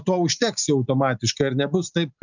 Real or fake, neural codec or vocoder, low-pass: real; none; 7.2 kHz